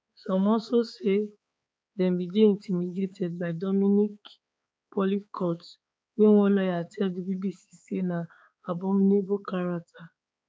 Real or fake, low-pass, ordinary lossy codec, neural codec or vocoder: fake; none; none; codec, 16 kHz, 4 kbps, X-Codec, HuBERT features, trained on balanced general audio